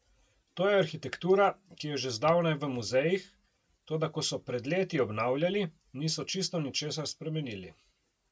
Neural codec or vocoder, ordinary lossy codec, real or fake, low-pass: none; none; real; none